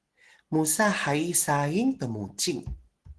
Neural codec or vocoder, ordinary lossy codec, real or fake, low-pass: none; Opus, 16 kbps; real; 10.8 kHz